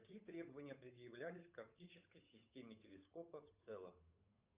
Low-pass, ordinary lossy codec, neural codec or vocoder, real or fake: 3.6 kHz; Opus, 64 kbps; codec, 16 kHz, 8 kbps, FunCodec, trained on Chinese and English, 25 frames a second; fake